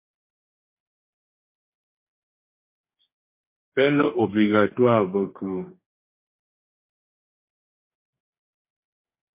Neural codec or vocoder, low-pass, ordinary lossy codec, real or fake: codec, 44.1 kHz, 2.6 kbps, DAC; 3.6 kHz; MP3, 24 kbps; fake